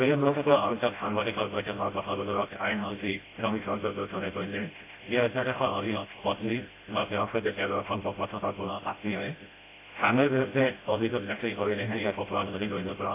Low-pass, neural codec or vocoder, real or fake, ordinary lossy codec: 3.6 kHz; codec, 16 kHz, 0.5 kbps, FreqCodec, smaller model; fake; AAC, 24 kbps